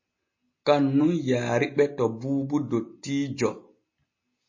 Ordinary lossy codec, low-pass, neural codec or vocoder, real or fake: MP3, 32 kbps; 7.2 kHz; none; real